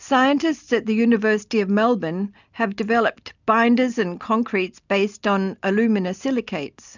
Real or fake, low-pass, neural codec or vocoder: real; 7.2 kHz; none